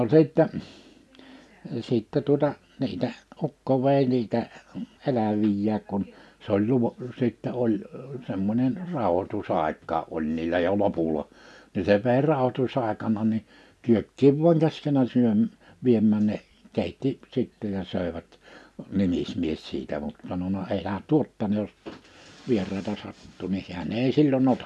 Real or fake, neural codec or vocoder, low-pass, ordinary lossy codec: real; none; none; none